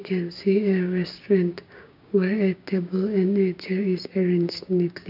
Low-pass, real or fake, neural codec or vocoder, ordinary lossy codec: 5.4 kHz; fake; codec, 16 kHz, 6 kbps, DAC; none